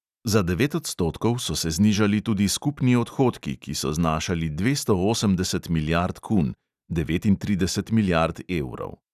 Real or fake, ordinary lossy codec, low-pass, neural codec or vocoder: real; none; 14.4 kHz; none